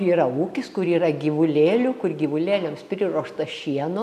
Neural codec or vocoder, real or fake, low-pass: none; real; 14.4 kHz